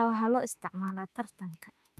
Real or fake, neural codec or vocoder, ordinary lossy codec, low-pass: fake; autoencoder, 48 kHz, 32 numbers a frame, DAC-VAE, trained on Japanese speech; none; 14.4 kHz